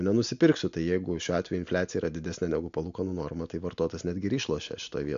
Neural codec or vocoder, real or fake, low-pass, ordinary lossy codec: none; real; 7.2 kHz; MP3, 64 kbps